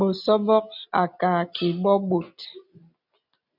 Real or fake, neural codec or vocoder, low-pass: real; none; 5.4 kHz